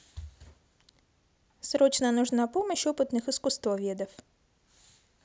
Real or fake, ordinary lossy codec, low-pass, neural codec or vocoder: real; none; none; none